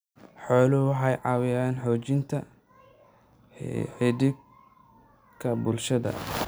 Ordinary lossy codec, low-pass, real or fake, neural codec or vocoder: none; none; real; none